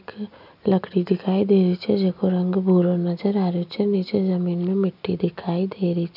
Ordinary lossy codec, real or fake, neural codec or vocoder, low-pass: none; real; none; 5.4 kHz